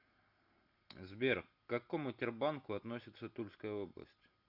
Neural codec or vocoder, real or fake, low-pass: none; real; 5.4 kHz